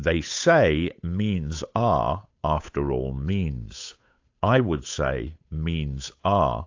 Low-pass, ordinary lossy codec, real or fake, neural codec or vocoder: 7.2 kHz; AAC, 48 kbps; fake; codec, 16 kHz, 8 kbps, FunCodec, trained on Chinese and English, 25 frames a second